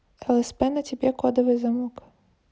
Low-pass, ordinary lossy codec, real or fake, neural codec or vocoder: none; none; real; none